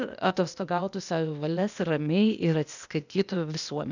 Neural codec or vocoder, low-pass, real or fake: codec, 16 kHz, 0.8 kbps, ZipCodec; 7.2 kHz; fake